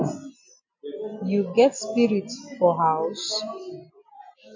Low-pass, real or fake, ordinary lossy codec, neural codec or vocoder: 7.2 kHz; real; MP3, 48 kbps; none